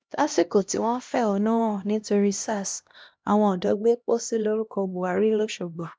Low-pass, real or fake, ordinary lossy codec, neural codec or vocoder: none; fake; none; codec, 16 kHz, 1 kbps, X-Codec, HuBERT features, trained on LibriSpeech